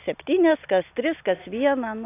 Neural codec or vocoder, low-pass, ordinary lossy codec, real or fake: none; 3.6 kHz; AAC, 24 kbps; real